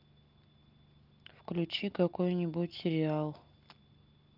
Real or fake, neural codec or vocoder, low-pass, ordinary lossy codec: real; none; 5.4 kHz; Opus, 24 kbps